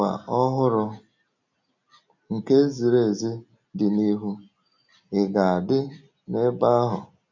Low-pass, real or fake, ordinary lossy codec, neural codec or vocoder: 7.2 kHz; real; none; none